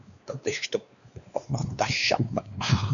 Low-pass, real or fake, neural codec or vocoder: 7.2 kHz; fake; codec, 16 kHz, 2 kbps, X-Codec, WavLM features, trained on Multilingual LibriSpeech